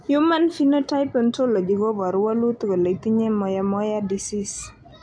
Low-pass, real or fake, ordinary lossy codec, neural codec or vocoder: 9.9 kHz; real; AAC, 64 kbps; none